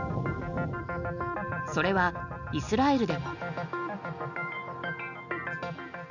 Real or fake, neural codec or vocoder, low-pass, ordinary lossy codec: real; none; 7.2 kHz; none